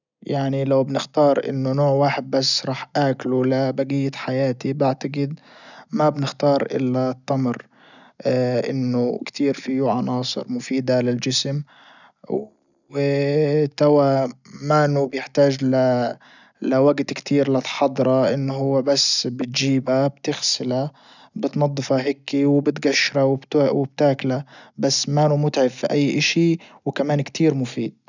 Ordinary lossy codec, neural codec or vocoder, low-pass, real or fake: none; none; 7.2 kHz; real